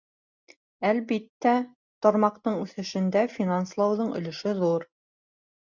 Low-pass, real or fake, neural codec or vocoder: 7.2 kHz; real; none